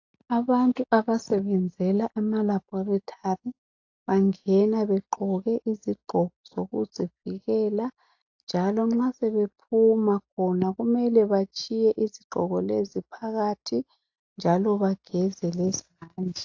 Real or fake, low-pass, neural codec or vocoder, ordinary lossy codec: real; 7.2 kHz; none; AAC, 48 kbps